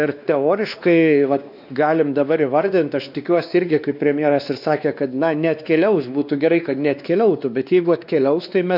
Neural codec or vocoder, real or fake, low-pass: codec, 16 kHz, 2 kbps, X-Codec, WavLM features, trained on Multilingual LibriSpeech; fake; 5.4 kHz